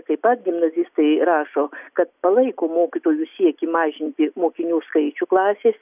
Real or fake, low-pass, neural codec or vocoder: real; 3.6 kHz; none